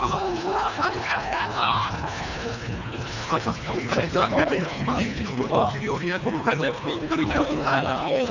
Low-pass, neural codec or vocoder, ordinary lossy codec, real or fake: 7.2 kHz; codec, 24 kHz, 1.5 kbps, HILCodec; none; fake